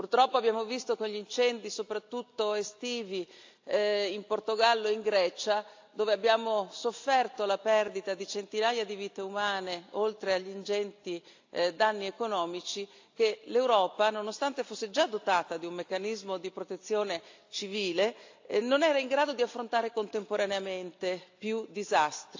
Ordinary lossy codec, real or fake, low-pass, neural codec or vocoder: AAC, 48 kbps; real; 7.2 kHz; none